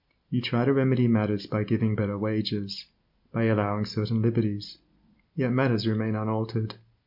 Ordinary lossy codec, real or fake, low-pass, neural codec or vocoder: MP3, 48 kbps; real; 5.4 kHz; none